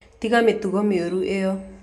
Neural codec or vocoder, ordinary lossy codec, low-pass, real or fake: none; none; 14.4 kHz; real